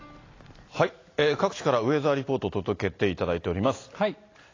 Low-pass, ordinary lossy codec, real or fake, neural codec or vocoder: 7.2 kHz; AAC, 32 kbps; real; none